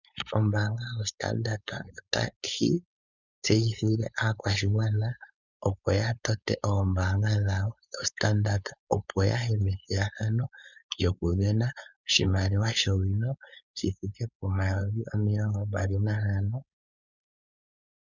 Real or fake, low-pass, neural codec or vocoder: fake; 7.2 kHz; codec, 16 kHz, 4.8 kbps, FACodec